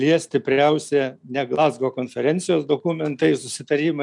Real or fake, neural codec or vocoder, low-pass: real; none; 10.8 kHz